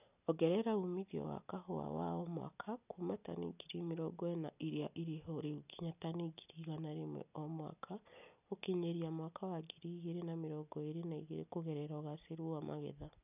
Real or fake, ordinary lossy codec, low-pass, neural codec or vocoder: real; none; 3.6 kHz; none